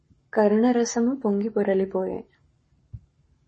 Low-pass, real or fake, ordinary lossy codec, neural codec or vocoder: 10.8 kHz; fake; MP3, 32 kbps; codec, 44.1 kHz, 7.8 kbps, Pupu-Codec